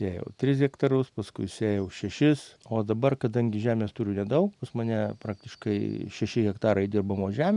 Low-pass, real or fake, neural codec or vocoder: 10.8 kHz; real; none